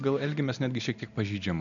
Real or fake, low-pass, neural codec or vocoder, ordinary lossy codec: real; 7.2 kHz; none; MP3, 96 kbps